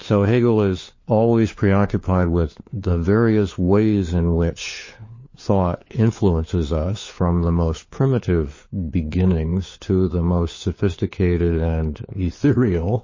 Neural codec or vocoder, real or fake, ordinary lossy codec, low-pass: codec, 16 kHz, 4 kbps, FunCodec, trained on LibriTTS, 50 frames a second; fake; MP3, 32 kbps; 7.2 kHz